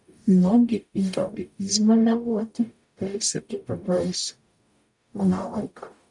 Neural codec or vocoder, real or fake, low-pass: codec, 44.1 kHz, 0.9 kbps, DAC; fake; 10.8 kHz